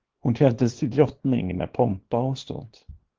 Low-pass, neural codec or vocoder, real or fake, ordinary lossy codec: 7.2 kHz; codec, 24 kHz, 0.9 kbps, WavTokenizer, small release; fake; Opus, 16 kbps